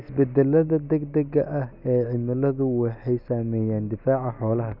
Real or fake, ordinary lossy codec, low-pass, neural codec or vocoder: real; none; 5.4 kHz; none